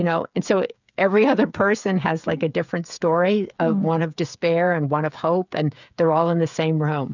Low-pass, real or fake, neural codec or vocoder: 7.2 kHz; fake; codec, 16 kHz, 8 kbps, FreqCodec, smaller model